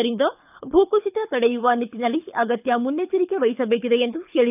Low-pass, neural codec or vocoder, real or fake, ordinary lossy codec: 3.6 kHz; codec, 24 kHz, 6 kbps, HILCodec; fake; none